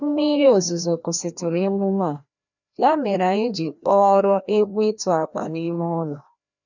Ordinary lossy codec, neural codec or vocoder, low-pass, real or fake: none; codec, 16 kHz, 1 kbps, FreqCodec, larger model; 7.2 kHz; fake